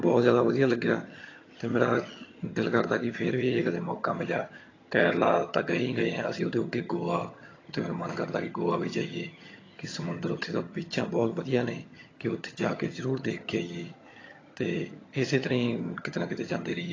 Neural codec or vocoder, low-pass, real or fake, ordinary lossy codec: vocoder, 22.05 kHz, 80 mel bands, HiFi-GAN; 7.2 kHz; fake; AAC, 32 kbps